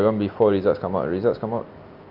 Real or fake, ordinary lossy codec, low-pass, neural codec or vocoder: real; Opus, 24 kbps; 5.4 kHz; none